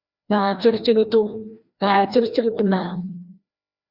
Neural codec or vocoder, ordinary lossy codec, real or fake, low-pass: codec, 16 kHz, 1 kbps, FreqCodec, larger model; Opus, 64 kbps; fake; 5.4 kHz